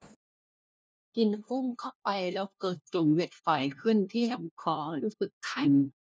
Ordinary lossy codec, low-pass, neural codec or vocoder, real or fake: none; none; codec, 16 kHz, 1 kbps, FunCodec, trained on LibriTTS, 50 frames a second; fake